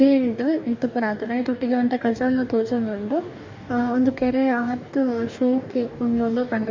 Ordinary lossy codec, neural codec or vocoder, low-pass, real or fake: MP3, 64 kbps; codec, 44.1 kHz, 2.6 kbps, DAC; 7.2 kHz; fake